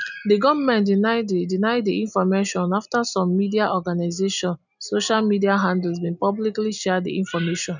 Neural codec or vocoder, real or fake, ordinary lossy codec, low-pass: none; real; none; 7.2 kHz